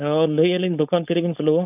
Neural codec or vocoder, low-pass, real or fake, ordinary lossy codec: codec, 16 kHz, 4.8 kbps, FACodec; 3.6 kHz; fake; none